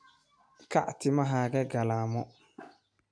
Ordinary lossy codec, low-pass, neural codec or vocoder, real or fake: AAC, 64 kbps; 9.9 kHz; none; real